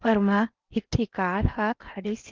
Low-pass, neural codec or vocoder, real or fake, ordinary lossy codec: 7.2 kHz; codec, 16 kHz in and 24 kHz out, 0.8 kbps, FocalCodec, streaming, 65536 codes; fake; Opus, 24 kbps